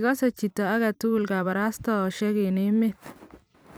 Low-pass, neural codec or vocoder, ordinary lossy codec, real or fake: none; vocoder, 44.1 kHz, 128 mel bands every 256 samples, BigVGAN v2; none; fake